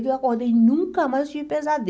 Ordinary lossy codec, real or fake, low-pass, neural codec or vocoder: none; real; none; none